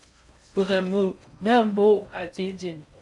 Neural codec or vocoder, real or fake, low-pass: codec, 16 kHz in and 24 kHz out, 0.6 kbps, FocalCodec, streaming, 4096 codes; fake; 10.8 kHz